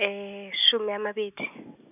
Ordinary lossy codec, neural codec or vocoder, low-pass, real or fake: none; none; 3.6 kHz; real